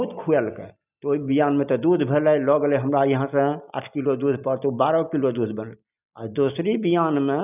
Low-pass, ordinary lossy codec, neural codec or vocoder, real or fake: 3.6 kHz; none; none; real